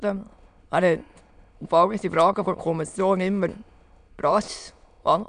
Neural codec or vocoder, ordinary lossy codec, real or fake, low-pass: autoencoder, 22.05 kHz, a latent of 192 numbers a frame, VITS, trained on many speakers; none; fake; 9.9 kHz